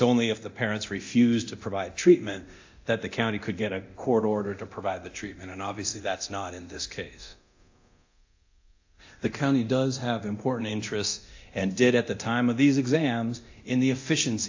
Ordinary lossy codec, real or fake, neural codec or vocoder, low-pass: AAC, 48 kbps; fake; codec, 24 kHz, 0.9 kbps, DualCodec; 7.2 kHz